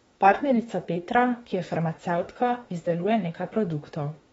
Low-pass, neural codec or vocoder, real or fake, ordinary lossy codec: 19.8 kHz; autoencoder, 48 kHz, 32 numbers a frame, DAC-VAE, trained on Japanese speech; fake; AAC, 24 kbps